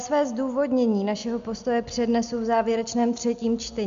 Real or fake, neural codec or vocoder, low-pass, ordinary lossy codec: real; none; 7.2 kHz; MP3, 64 kbps